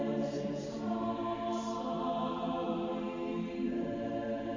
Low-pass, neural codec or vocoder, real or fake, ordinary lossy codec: 7.2 kHz; vocoder, 44.1 kHz, 128 mel bands every 512 samples, BigVGAN v2; fake; AAC, 48 kbps